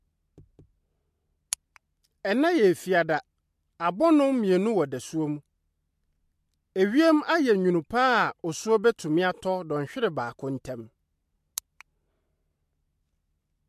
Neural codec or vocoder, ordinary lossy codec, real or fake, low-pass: none; MP3, 64 kbps; real; 14.4 kHz